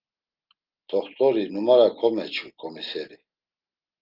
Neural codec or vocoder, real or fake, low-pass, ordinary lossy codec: none; real; 5.4 kHz; Opus, 32 kbps